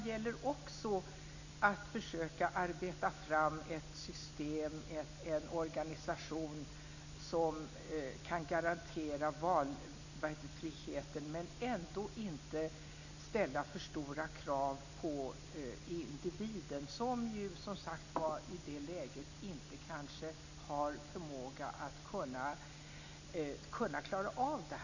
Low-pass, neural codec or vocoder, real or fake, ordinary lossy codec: 7.2 kHz; none; real; none